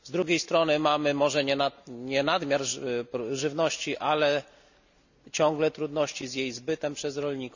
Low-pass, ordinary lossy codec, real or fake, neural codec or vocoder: 7.2 kHz; none; real; none